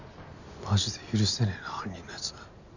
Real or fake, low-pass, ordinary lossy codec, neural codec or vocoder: real; 7.2 kHz; none; none